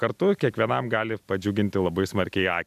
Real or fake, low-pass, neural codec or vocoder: real; 14.4 kHz; none